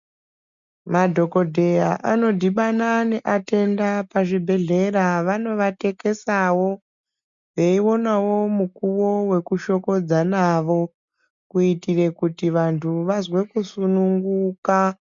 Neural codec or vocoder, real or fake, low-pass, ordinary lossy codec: none; real; 7.2 kHz; MP3, 64 kbps